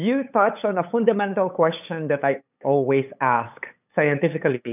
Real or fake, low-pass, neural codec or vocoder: fake; 3.6 kHz; codec, 16 kHz, 8 kbps, FunCodec, trained on LibriTTS, 25 frames a second